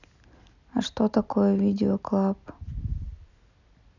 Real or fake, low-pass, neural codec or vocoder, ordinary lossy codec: real; 7.2 kHz; none; none